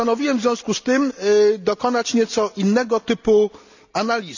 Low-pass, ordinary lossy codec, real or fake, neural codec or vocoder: 7.2 kHz; none; real; none